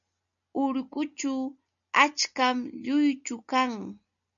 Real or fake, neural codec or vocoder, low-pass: real; none; 7.2 kHz